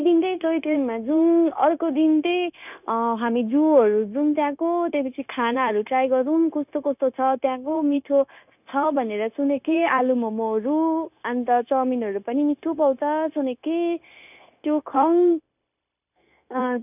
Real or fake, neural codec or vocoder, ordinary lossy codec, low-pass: fake; codec, 16 kHz, 0.9 kbps, LongCat-Audio-Codec; none; 3.6 kHz